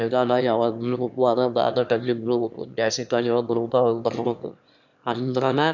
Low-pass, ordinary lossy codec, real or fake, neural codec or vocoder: 7.2 kHz; none; fake; autoencoder, 22.05 kHz, a latent of 192 numbers a frame, VITS, trained on one speaker